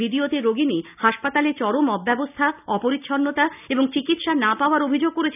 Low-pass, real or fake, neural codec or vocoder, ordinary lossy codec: 3.6 kHz; real; none; none